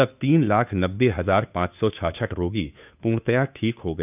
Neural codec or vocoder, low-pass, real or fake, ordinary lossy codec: codec, 16 kHz, 2 kbps, FunCodec, trained on Chinese and English, 25 frames a second; 3.6 kHz; fake; none